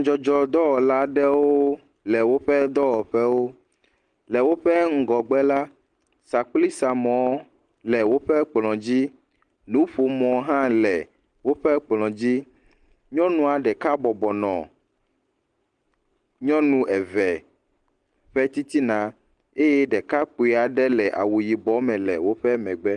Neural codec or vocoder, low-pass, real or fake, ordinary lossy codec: none; 9.9 kHz; real; Opus, 24 kbps